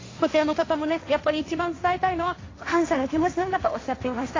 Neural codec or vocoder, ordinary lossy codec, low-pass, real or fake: codec, 16 kHz, 1.1 kbps, Voila-Tokenizer; AAC, 32 kbps; 7.2 kHz; fake